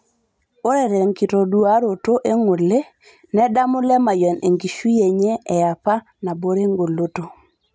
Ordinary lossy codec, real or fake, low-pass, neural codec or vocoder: none; real; none; none